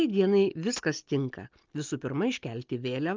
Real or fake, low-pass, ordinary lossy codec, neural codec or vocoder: real; 7.2 kHz; Opus, 24 kbps; none